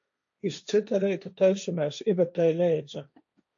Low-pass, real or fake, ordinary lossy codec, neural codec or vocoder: 7.2 kHz; fake; AAC, 64 kbps; codec, 16 kHz, 1.1 kbps, Voila-Tokenizer